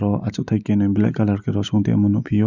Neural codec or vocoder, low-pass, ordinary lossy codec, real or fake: none; 7.2 kHz; none; real